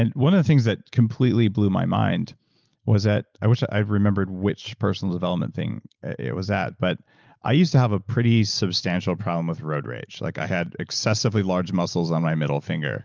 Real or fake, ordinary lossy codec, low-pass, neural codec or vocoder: real; Opus, 24 kbps; 7.2 kHz; none